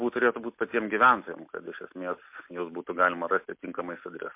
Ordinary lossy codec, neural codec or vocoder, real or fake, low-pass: MP3, 32 kbps; none; real; 3.6 kHz